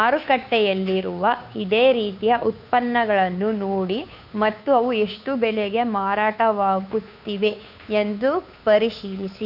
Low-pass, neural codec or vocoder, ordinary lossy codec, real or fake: 5.4 kHz; codec, 16 kHz, 2 kbps, FunCodec, trained on Chinese and English, 25 frames a second; none; fake